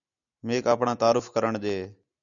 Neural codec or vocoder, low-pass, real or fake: none; 7.2 kHz; real